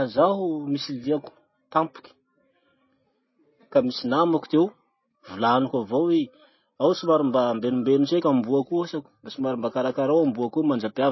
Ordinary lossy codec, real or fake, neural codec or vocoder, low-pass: MP3, 24 kbps; real; none; 7.2 kHz